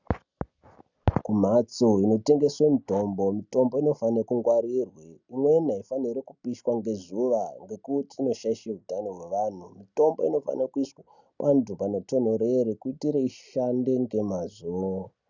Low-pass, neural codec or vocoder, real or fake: 7.2 kHz; none; real